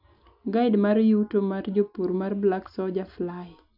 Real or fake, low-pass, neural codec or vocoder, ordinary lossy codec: real; 5.4 kHz; none; AAC, 48 kbps